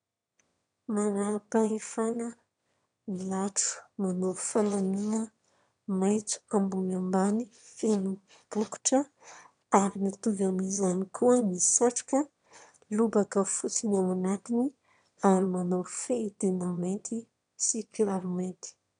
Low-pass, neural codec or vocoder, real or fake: 9.9 kHz; autoencoder, 22.05 kHz, a latent of 192 numbers a frame, VITS, trained on one speaker; fake